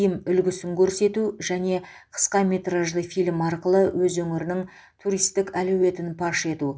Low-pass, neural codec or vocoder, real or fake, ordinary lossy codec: none; none; real; none